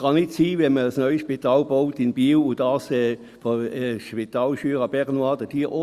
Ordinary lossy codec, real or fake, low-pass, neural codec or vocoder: Opus, 64 kbps; real; 14.4 kHz; none